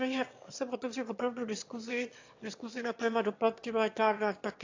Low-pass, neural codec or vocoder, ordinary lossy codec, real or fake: 7.2 kHz; autoencoder, 22.05 kHz, a latent of 192 numbers a frame, VITS, trained on one speaker; AAC, 48 kbps; fake